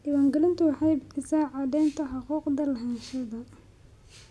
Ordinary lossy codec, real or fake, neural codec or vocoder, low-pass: none; real; none; none